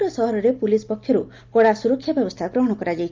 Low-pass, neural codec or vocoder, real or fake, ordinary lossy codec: 7.2 kHz; none; real; Opus, 24 kbps